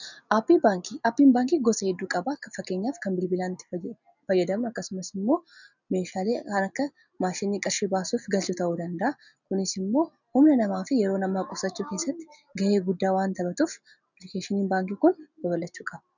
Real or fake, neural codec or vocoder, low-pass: real; none; 7.2 kHz